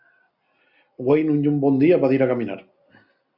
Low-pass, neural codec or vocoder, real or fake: 5.4 kHz; none; real